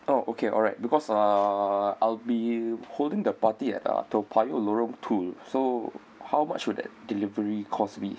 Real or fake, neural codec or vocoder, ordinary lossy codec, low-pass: real; none; none; none